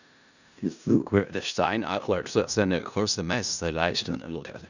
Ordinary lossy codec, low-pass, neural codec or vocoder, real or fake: none; 7.2 kHz; codec, 16 kHz in and 24 kHz out, 0.4 kbps, LongCat-Audio-Codec, four codebook decoder; fake